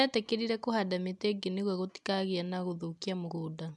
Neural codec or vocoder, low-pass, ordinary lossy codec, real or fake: none; 10.8 kHz; MP3, 96 kbps; real